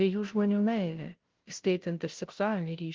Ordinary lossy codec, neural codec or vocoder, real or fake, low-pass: Opus, 16 kbps; codec, 16 kHz, 0.5 kbps, FunCodec, trained on Chinese and English, 25 frames a second; fake; 7.2 kHz